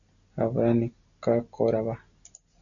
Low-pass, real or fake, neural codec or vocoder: 7.2 kHz; real; none